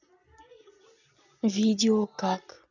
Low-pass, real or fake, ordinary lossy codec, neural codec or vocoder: 7.2 kHz; real; none; none